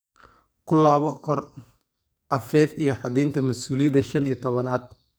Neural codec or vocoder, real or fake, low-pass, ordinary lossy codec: codec, 44.1 kHz, 2.6 kbps, SNAC; fake; none; none